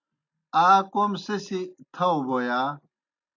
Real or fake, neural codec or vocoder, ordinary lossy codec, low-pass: real; none; AAC, 48 kbps; 7.2 kHz